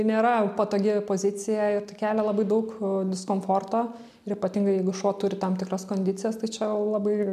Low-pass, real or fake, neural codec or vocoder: 14.4 kHz; real; none